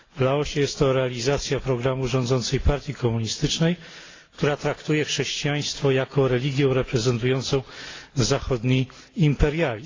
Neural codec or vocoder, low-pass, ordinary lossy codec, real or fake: none; 7.2 kHz; AAC, 32 kbps; real